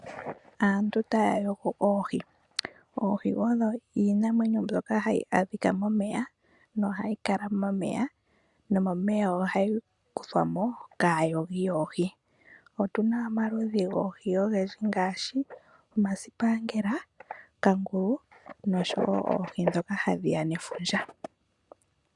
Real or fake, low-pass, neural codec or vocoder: real; 10.8 kHz; none